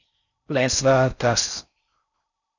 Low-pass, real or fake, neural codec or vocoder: 7.2 kHz; fake; codec, 16 kHz in and 24 kHz out, 0.6 kbps, FocalCodec, streaming, 4096 codes